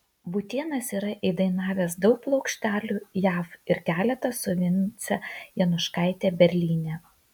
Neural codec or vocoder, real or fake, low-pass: none; real; 19.8 kHz